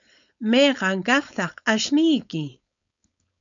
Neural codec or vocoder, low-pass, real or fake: codec, 16 kHz, 4.8 kbps, FACodec; 7.2 kHz; fake